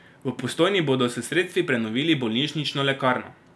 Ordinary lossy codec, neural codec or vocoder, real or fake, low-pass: none; none; real; none